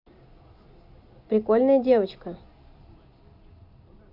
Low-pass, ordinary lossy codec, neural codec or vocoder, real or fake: 5.4 kHz; none; none; real